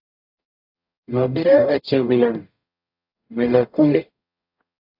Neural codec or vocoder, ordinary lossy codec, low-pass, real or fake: codec, 44.1 kHz, 0.9 kbps, DAC; AAC, 48 kbps; 5.4 kHz; fake